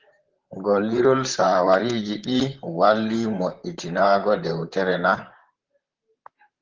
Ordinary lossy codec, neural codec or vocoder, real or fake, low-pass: Opus, 16 kbps; vocoder, 44.1 kHz, 128 mel bands, Pupu-Vocoder; fake; 7.2 kHz